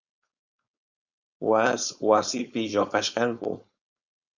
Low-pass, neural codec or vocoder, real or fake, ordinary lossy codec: 7.2 kHz; codec, 16 kHz, 4.8 kbps, FACodec; fake; Opus, 64 kbps